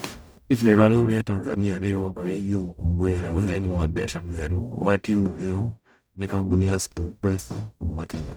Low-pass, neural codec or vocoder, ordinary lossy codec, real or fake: none; codec, 44.1 kHz, 0.9 kbps, DAC; none; fake